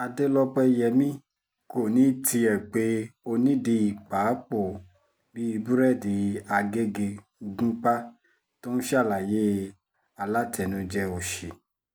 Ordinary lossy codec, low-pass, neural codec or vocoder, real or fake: none; none; none; real